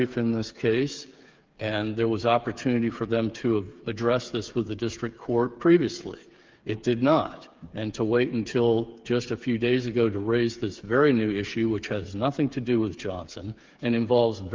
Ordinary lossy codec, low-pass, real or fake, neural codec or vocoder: Opus, 24 kbps; 7.2 kHz; fake; codec, 16 kHz, 8 kbps, FreqCodec, smaller model